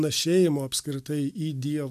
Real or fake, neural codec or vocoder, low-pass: real; none; 14.4 kHz